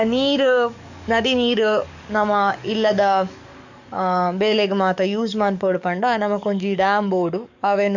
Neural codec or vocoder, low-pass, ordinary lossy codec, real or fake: codec, 44.1 kHz, 7.8 kbps, DAC; 7.2 kHz; none; fake